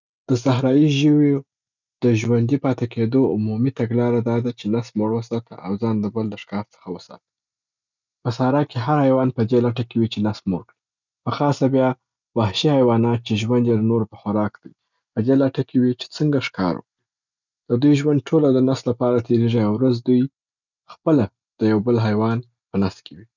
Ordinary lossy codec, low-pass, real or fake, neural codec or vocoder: none; 7.2 kHz; real; none